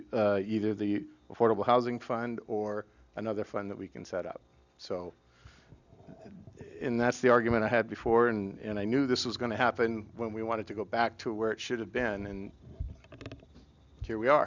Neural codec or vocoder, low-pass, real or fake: none; 7.2 kHz; real